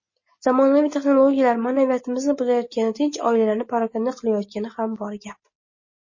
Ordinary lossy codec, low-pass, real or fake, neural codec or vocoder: MP3, 32 kbps; 7.2 kHz; real; none